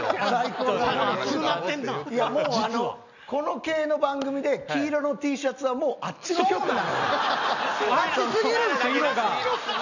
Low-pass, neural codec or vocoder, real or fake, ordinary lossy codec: 7.2 kHz; none; real; none